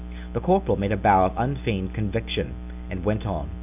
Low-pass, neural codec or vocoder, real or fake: 3.6 kHz; none; real